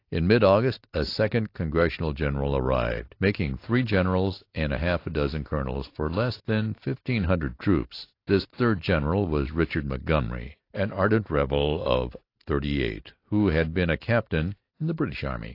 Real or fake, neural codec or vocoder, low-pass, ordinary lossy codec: real; none; 5.4 kHz; AAC, 32 kbps